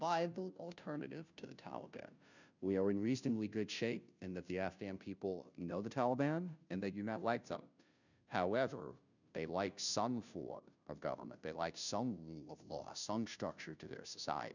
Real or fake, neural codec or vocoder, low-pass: fake; codec, 16 kHz, 0.5 kbps, FunCodec, trained on Chinese and English, 25 frames a second; 7.2 kHz